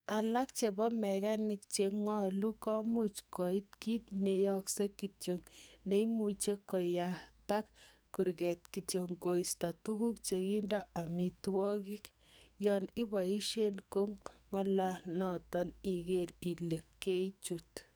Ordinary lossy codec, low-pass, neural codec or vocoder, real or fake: none; none; codec, 44.1 kHz, 2.6 kbps, SNAC; fake